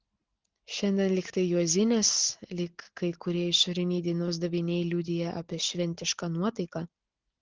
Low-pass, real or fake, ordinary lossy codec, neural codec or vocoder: 7.2 kHz; real; Opus, 16 kbps; none